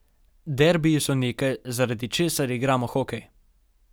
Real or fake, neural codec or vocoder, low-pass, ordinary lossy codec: real; none; none; none